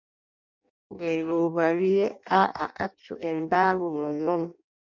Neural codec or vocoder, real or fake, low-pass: codec, 16 kHz in and 24 kHz out, 0.6 kbps, FireRedTTS-2 codec; fake; 7.2 kHz